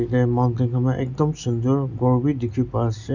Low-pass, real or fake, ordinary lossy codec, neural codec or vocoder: 7.2 kHz; real; none; none